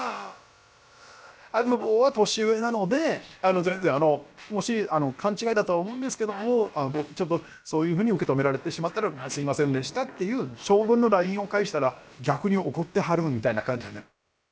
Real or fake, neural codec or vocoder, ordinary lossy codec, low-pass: fake; codec, 16 kHz, about 1 kbps, DyCAST, with the encoder's durations; none; none